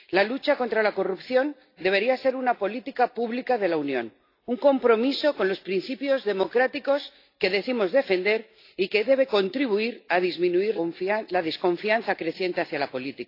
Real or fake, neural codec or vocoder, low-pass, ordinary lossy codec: real; none; 5.4 kHz; AAC, 32 kbps